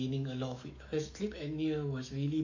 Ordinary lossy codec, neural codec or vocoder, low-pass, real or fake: AAC, 48 kbps; autoencoder, 48 kHz, 128 numbers a frame, DAC-VAE, trained on Japanese speech; 7.2 kHz; fake